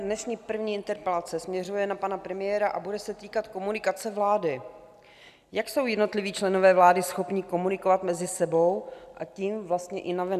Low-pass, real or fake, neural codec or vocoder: 14.4 kHz; real; none